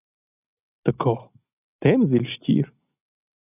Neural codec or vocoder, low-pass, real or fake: none; 3.6 kHz; real